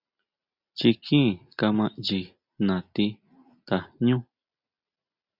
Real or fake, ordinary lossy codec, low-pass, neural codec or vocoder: real; Opus, 64 kbps; 5.4 kHz; none